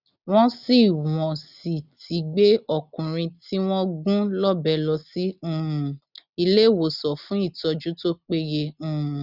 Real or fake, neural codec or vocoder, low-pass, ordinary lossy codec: real; none; 5.4 kHz; none